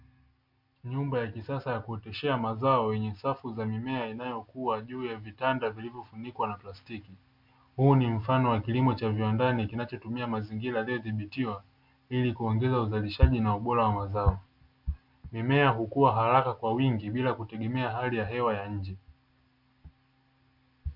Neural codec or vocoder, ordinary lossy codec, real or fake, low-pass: none; MP3, 48 kbps; real; 5.4 kHz